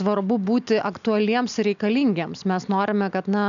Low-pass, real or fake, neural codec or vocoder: 7.2 kHz; real; none